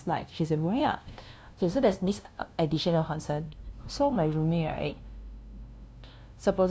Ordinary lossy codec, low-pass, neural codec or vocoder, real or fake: none; none; codec, 16 kHz, 0.5 kbps, FunCodec, trained on LibriTTS, 25 frames a second; fake